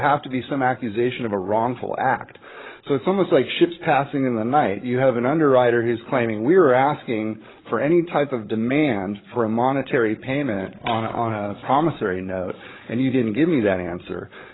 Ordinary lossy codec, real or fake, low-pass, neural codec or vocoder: AAC, 16 kbps; fake; 7.2 kHz; codec, 16 kHz, 8 kbps, FreqCodec, larger model